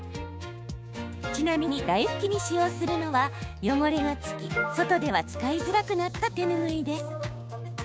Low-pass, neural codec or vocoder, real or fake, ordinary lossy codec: none; codec, 16 kHz, 6 kbps, DAC; fake; none